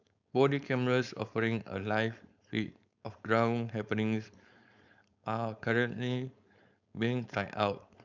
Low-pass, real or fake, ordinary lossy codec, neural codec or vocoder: 7.2 kHz; fake; none; codec, 16 kHz, 4.8 kbps, FACodec